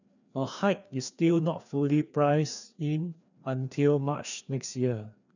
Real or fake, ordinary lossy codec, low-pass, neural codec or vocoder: fake; none; 7.2 kHz; codec, 16 kHz, 2 kbps, FreqCodec, larger model